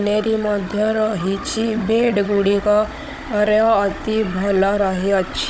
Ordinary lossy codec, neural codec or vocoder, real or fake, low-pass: none; codec, 16 kHz, 16 kbps, FunCodec, trained on Chinese and English, 50 frames a second; fake; none